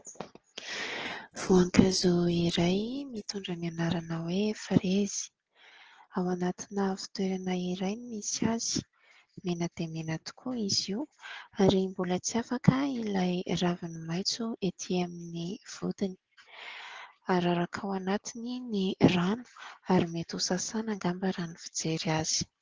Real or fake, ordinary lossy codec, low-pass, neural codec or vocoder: real; Opus, 16 kbps; 7.2 kHz; none